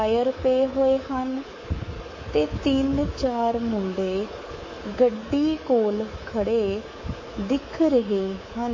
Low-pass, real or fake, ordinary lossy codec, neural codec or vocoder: 7.2 kHz; fake; MP3, 32 kbps; codec, 24 kHz, 3.1 kbps, DualCodec